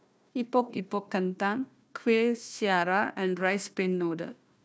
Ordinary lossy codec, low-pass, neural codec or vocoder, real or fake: none; none; codec, 16 kHz, 1 kbps, FunCodec, trained on Chinese and English, 50 frames a second; fake